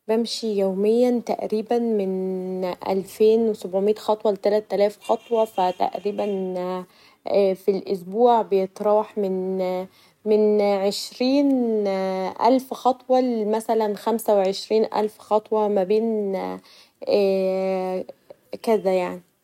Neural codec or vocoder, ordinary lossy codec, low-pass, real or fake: none; none; 19.8 kHz; real